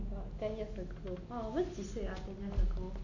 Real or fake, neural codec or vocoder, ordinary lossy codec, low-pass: real; none; none; 7.2 kHz